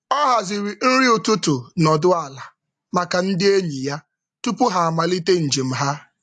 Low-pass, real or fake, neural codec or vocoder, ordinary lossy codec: 10.8 kHz; real; none; AAC, 64 kbps